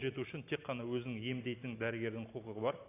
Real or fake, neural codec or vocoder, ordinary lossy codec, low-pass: real; none; AAC, 24 kbps; 3.6 kHz